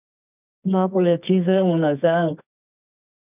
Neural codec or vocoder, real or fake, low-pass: codec, 24 kHz, 0.9 kbps, WavTokenizer, medium music audio release; fake; 3.6 kHz